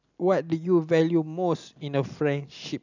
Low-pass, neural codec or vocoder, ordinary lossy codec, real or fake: 7.2 kHz; none; none; real